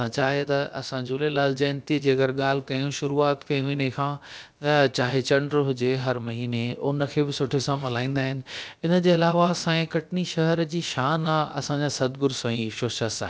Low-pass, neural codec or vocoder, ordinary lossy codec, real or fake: none; codec, 16 kHz, about 1 kbps, DyCAST, with the encoder's durations; none; fake